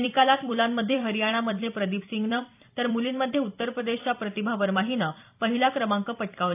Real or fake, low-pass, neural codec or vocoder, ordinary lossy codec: fake; 3.6 kHz; vocoder, 44.1 kHz, 128 mel bands every 256 samples, BigVGAN v2; AAC, 32 kbps